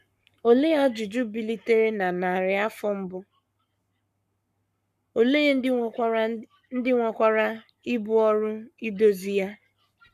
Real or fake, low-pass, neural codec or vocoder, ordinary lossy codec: fake; 14.4 kHz; codec, 44.1 kHz, 7.8 kbps, Pupu-Codec; MP3, 96 kbps